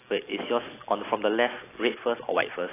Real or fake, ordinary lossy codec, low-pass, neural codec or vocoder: real; AAC, 16 kbps; 3.6 kHz; none